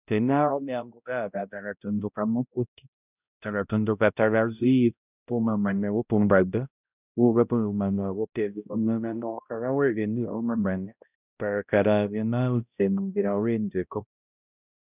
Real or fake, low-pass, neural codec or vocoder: fake; 3.6 kHz; codec, 16 kHz, 0.5 kbps, X-Codec, HuBERT features, trained on balanced general audio